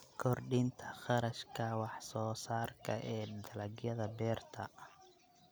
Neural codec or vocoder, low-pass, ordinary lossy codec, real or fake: none; none; none; real